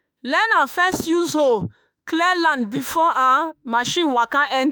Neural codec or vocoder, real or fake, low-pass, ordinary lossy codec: autoencoder, 48 kHz, 32 numbers a frame, DAC-VAE, trained on Japanese speech; fake; none; none